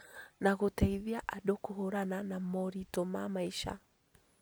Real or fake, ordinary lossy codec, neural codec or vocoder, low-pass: real; none; none; none